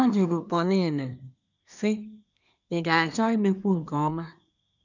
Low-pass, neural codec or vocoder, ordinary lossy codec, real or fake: 7.2 kHz; codec, 24 kHz, 1 kbps, SNAC; none; fake